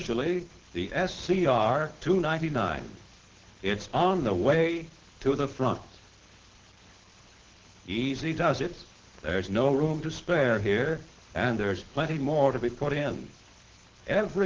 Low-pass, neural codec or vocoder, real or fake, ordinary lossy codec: 7.2 kHz; codec, 24 kHz, 6 kbps, HILCodec; fake; Opus, 16 kbps